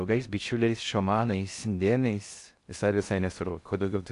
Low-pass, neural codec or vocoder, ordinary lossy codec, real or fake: 10.8 kHz; codec, 16 kHz in and 24 kHz out, 0.6 kbps, FocalCodec, streaming, 4096 codes; AAC, 64 kbps; fake